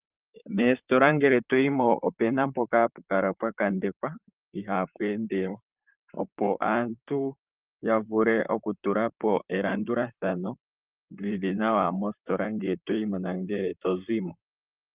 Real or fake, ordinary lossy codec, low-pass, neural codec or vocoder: fake; Opus, 24 kbps; 3.6 kHz; vocoder, 44.1 kHz, 128 mel bands, Pupu-Vocoder